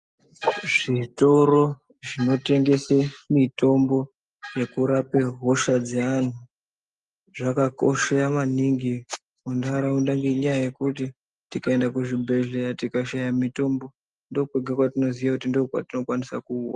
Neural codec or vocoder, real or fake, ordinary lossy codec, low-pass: none; real; Opus, 32 kbps; 10.8 kHz